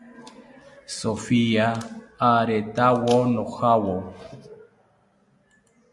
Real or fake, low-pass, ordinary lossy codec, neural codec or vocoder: real; 10.8 kHz; AAC, 64 kbps; none